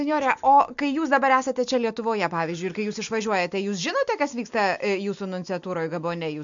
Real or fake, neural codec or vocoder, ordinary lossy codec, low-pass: real; none; MP3, 64 kbps; 7.2 kHz